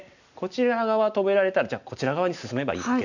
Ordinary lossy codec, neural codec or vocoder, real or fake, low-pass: none; none; real; 7.2 kHz